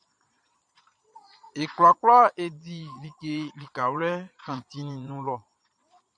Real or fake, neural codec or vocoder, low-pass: fake; vocoder, 22.05 kHz, 80 mel bands, Vocos; 9.9 kHz